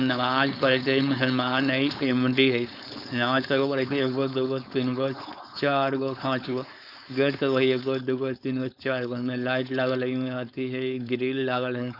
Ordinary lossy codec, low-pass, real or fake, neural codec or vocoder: none; 5.4 kHz; fake; codec, 16 kHz, 4.8 kbps, FACodec